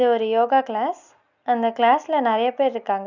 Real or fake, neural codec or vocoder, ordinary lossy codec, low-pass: real; none; none; 7.2 kHz